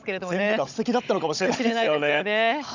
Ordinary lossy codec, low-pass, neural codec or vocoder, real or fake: none; 7.2 kHz; codec, 16 kHz, 16 kbps, FunCodec, trained on Chinese and English, 50 frames a second; fake